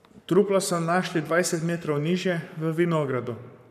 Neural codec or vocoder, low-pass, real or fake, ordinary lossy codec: codec, 44.1 kHz, 7.8 kbps, Pupu-Codec; 14.4 kHz; fake; none